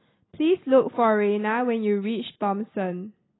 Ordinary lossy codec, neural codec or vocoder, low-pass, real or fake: AAC, 16 kbps; none; 7.2 kHz; real